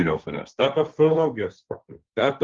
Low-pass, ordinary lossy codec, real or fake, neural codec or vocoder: 7.2 kHz; Opus, 32 kbps; fake; codec, 16 kHz, 1.1 kbps, Voila-Tokenizer